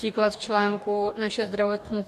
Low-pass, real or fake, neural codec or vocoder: 14.4 kHz; fake; codec, 44.1 kHz, 2.6 kbps, DAC